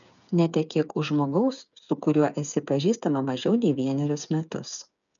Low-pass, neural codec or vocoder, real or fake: 7.2 kHz; codec, 16 kHz, 8 kbps, FreqCodec, smaller model; fake